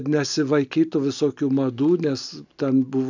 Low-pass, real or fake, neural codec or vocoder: 7.2 kHz; real; none